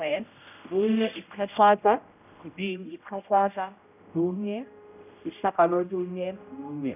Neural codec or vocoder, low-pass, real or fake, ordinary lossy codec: codec, 16 kHz, 0.5 kbps, X-Codec, HuBERT features, trained on general audio; 3.6 kHz; fake; none